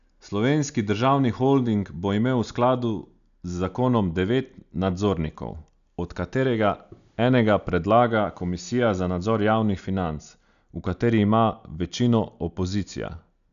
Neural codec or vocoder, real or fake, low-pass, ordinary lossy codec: none; real; 7.2 kHz; none